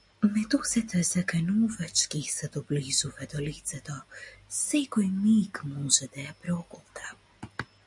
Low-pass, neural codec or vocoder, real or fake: 10.8 kHz; none; real